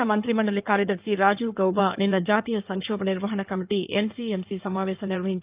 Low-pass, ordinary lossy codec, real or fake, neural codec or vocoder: 3.6 kHz; Opus, 24 kbps; fake; codec, 16 kHz in and 24 kHz out, 2.2 kbps, FireRedTTS-2 codec